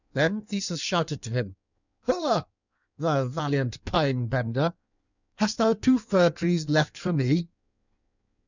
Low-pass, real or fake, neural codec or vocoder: 7.2 kHz; fake; codec, 16 kHz in and 24 kHz out, 1.1 kbps, FireRedTTS-2 codec